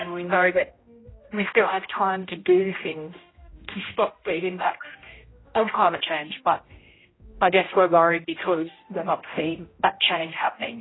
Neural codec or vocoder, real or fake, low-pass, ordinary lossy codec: codec, 16 kHz, 0.5 kbps, X-Codec, HuBERT features, trained on general audio; fake; 7.2 kHz; AAC, 16 kbps